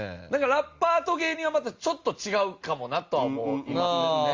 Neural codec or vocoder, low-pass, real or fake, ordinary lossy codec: none; 7.2 kHz; real; Opus, 32 kbps